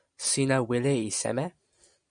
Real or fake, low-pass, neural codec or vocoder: real; 9.9 kHz; none